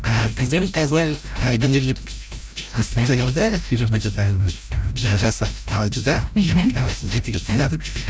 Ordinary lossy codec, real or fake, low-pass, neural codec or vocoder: none; fake; none; codec, 16 kHz, 0.5 kbps, FreqCodec, larger model